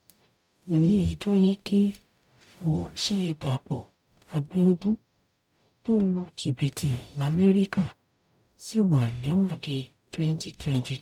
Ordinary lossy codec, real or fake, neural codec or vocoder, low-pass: none; fake; codec, 44.1 kHz, 0.9 kbps, DAC; 19.8 kHz